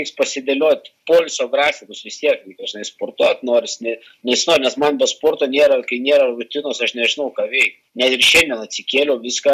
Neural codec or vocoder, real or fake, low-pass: none; real; 14.4 kHz